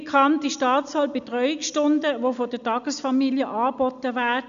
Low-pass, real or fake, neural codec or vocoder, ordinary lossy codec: 7.2 kHz; real; none; none